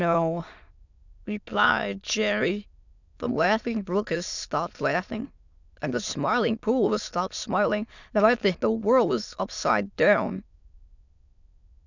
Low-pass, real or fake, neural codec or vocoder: 7.2 kHz; fake; autoencoder, 22.05 kHz, a latent of 192 numbers a frame, VITS, trained on many speakers